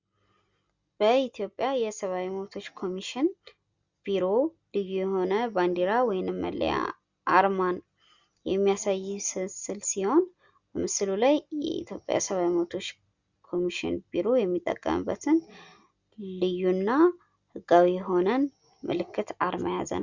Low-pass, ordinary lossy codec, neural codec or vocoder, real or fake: 7.2 kHz; Opus, 64 kbps; none; real